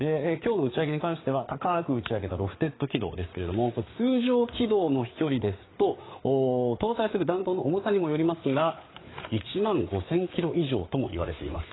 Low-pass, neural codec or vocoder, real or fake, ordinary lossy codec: 7.2 kHz; codec, 16 kHz, 4 kbps, FreqCodec, larger model; fake; AAC, 16 kbps